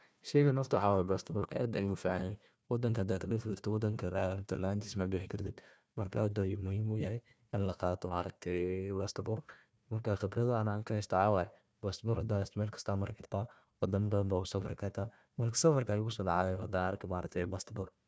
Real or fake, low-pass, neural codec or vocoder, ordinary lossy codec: fake; none; codec, 16 kHz, 1 kbps, FunCodec, trained on Chinese and English, 50 frames a second; none